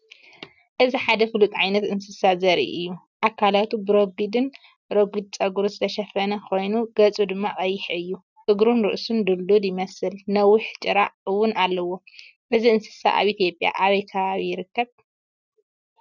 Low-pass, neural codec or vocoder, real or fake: 7.2 kHz; none; real